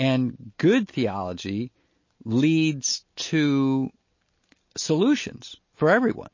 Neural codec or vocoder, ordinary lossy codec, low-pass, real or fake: none; MP3, 32 kbps; 7.2 kHz; real